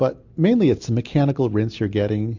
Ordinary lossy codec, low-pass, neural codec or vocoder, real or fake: MP3, 48 kbps; 7.2 kHz; none; real